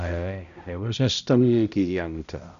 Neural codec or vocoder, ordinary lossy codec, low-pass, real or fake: codec, 16 kHz, 0.5 kbps, X-Codec, HuBERT features, trained on balanced general audio; Opus, 64 kbps; 7.2 kHz; fake